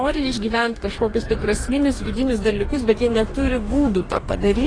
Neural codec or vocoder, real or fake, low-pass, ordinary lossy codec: codec, 44.1 kHz, 2.6 kbps, DAC; fake; 9.9 kHz; AAC, 32 kbps